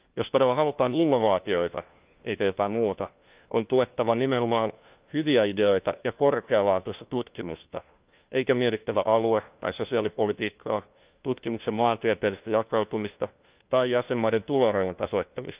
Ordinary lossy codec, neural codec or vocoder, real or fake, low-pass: Opus, 24 kbps; codec, 16 kHz, 1 kbps, FunCodec, trained on LibriTTS, 50 frames a second; fake; 3.6 kHz